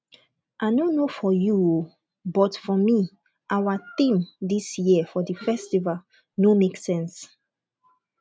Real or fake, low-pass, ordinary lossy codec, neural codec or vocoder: real; none; none; none